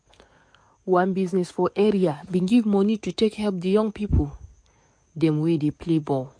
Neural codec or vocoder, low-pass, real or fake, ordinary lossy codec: codec, 44.1 kHz, 7.8 kbps, DAC; 9.9 kHz; fake; MP3, 48 kbps